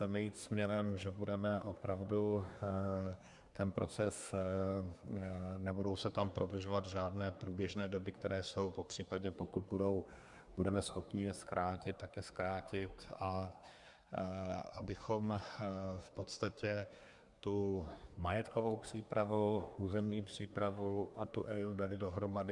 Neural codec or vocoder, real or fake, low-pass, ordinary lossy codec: codec, 24 kHz, 1 kbps, SNAC; fake; 10.8 kHz; Opus, 64 kbps